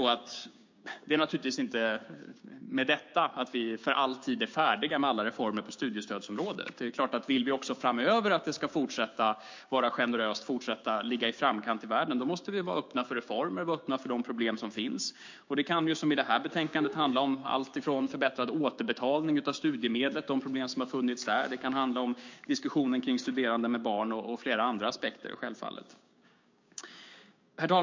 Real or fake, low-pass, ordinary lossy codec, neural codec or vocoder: fake; 7.2 kHz; MP3, 48 kbps; codec, 16 kHz, 6 kbps, DAC